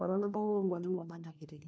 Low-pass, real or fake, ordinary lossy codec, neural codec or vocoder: none; fake; none; codec, 16 kHz, 1 kbps, FunCodec, trained on LibriTTS, 50 frames a second